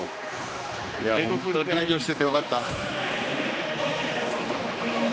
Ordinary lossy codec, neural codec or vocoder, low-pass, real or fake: none; codec, 16 kHz, 2 kbps, X-Codec, HuBERT features, trained on general audio; none; fake